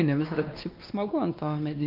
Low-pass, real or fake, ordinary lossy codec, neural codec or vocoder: 5.4 kHz; fake; Opus, 24 kbps; codec, 16 kHz, 2 kbps, X-Codec, WavLM features, trained on Multilingual LibriSpeech